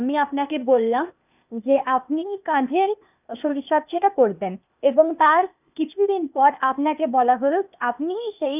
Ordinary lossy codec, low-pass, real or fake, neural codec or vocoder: none; 3.6 kHz; fake; codec, 16 kHz, 0.8 kbps, ZipCodec